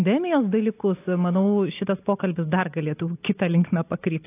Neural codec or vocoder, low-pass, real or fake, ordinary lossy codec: none; 3.6 kHz; real; AAC, 24 kbps